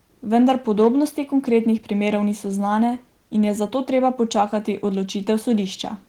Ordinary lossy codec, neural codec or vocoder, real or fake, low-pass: Opus, 16 kbps; none; real; 19.8 kHz